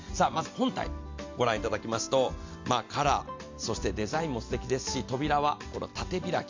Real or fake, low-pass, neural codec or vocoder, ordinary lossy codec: real; 7.2 kHz; none; MP3, 64 kbps